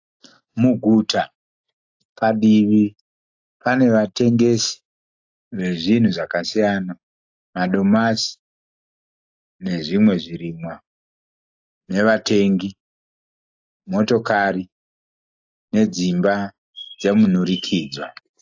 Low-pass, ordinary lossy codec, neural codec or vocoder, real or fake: 7.2 kHz; AAC, 48 kbps; none; real